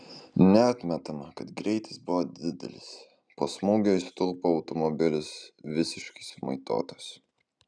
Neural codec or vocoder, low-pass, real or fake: none; 9.9 kHz; real